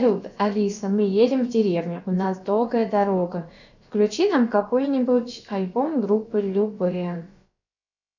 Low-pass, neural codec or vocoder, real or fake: 7.2 kHz; codec, 16 kHz, about 1 kbps, DyCAST, with the encoder's durations; fake